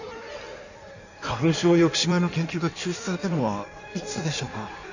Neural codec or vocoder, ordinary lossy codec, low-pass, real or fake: codec, 16 kHz in and 24 kHz out, 1.1 kbps, FireRedTTS-2 codec; none; 7.2 kHz; fake